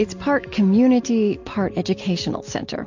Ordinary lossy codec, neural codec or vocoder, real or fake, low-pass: MP3, 48 kbps; none; real; 7.2 kHz